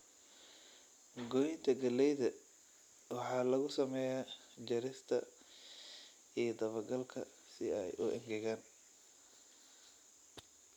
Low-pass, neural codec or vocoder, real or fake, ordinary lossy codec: 19.8 kHz; none; real; none